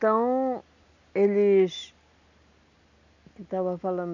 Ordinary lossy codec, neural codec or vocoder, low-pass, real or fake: none; none; 7.2 kHz; real